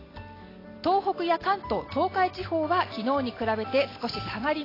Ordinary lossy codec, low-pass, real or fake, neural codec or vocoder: AAC, 24 kbps; 5.4 kHz; real; none